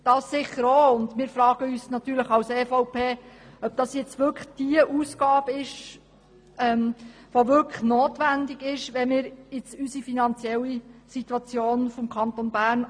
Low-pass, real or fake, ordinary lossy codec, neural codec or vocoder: 9.9 kHz; real; MP3, 64 kbps; none